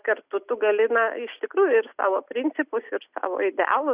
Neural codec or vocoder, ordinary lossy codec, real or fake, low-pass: none; Opus, 64 kbps; real; 3.6 kHz